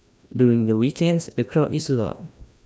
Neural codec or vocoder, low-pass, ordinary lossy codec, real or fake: codec, 16 kHz, 1 kbps, FreqCodec, larger model; none; none; fake